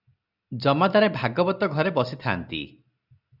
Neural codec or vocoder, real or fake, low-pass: none; real; 5.4 kHz